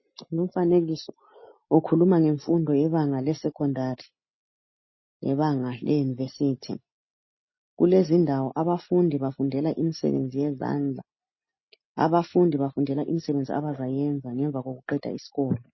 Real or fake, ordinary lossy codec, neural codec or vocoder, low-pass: real; MP3, 24 kbps; none; 7.2 kHz